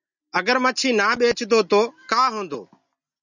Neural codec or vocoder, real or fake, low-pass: none; real; 7.2 kHz